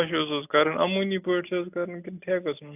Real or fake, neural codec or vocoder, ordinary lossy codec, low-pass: real; none; AAC, 32 kbps; 3.6 kHz